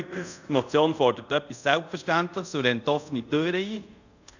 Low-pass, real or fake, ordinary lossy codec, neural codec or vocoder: 7.2 kHz; fake; none; codec, 24 kHz, 0.5 kbps, DualCodec